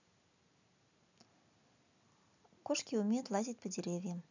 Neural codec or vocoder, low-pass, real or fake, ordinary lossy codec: none; 7.2 kHz; real; none